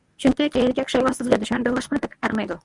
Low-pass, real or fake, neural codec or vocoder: 10.8 kHz; fake; codec, 24 kHz, 0.9 kbps, WavTokenizer, medium speech release version 1